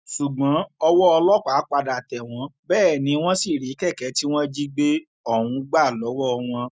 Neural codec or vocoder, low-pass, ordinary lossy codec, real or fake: none; none; none; real